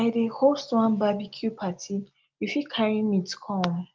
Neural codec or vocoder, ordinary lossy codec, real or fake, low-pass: none; Opus, 32 kbps; real; 7.2 kHz